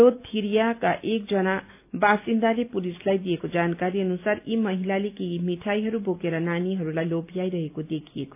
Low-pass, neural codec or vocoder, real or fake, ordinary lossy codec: 3.6 kHz; none; real; AAC, 32 kbps